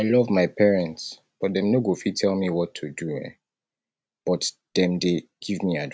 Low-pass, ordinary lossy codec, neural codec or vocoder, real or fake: none; none; none; real